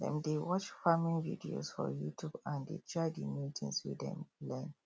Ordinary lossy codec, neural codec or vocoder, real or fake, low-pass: none; none; real; none